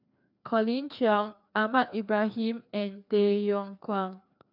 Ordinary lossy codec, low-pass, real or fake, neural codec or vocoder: none; 5.4 kHz; fake; codec, 16 kHz, 2 kbps, FreqCodec, larger model